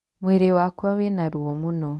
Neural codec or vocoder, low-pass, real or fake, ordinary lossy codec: codec, 24 kHz, 0.9 kbps, WavTokenizer, medium speech release version 1; none; fake; none